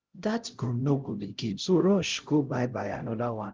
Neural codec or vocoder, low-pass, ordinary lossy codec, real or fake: codec, 16 kHz, 0.5 kbps, X-Codec, HuBERT features, trained on LibriSpeech; 7.2 kHz; Opus, 16 kbps; fake